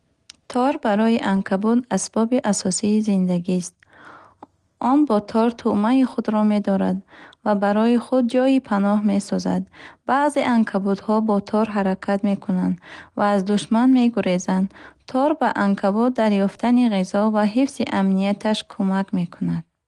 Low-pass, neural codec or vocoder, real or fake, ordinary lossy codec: 10.8 kHz; none; real; Opus, 24 kbps